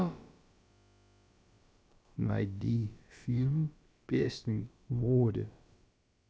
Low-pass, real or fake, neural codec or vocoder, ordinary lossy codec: none; fake; codec, 16 kHz, about 1 kbps, DyCAST, with the encoder's durations; none